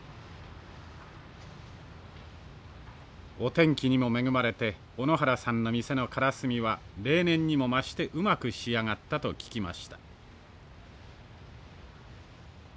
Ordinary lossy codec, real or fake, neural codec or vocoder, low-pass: none; real; none; none